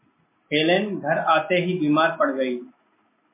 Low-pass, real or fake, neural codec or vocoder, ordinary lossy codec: 3.6 kHz; real; none; MP3, 24 kbps